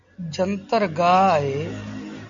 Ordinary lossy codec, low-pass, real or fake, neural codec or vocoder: MP3, 64 kbps; 7.2 kHz; real; none